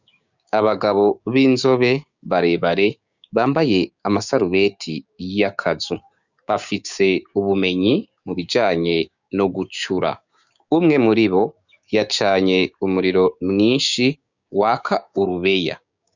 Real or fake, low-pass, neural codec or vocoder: fake; 7.2 kHz; codec, 16 kHz, 6 kbps, DAC